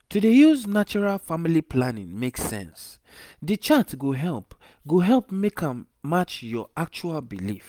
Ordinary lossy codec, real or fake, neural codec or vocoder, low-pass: Opus, 32 kbps; real; none; 19.8 kHz